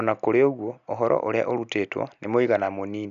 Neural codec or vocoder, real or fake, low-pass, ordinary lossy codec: none; real; 7.2 kHz; none